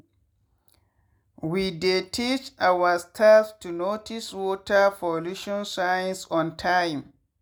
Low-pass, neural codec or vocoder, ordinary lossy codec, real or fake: none; none; none; real